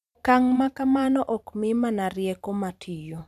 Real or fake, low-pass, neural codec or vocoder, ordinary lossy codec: fake; 14.4 kHz; vocoder, 44.1 kHz, 128 mel bands every 256 samples, BigVGAN v2; none